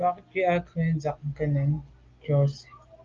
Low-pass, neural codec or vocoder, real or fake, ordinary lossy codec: 7.2 kHz; none; real; Opus, 32 kbps